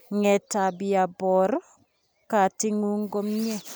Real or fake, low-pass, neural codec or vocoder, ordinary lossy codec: real; none; none; none